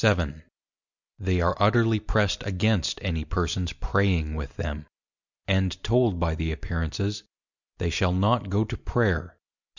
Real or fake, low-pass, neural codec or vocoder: real; 7.2 kHz; none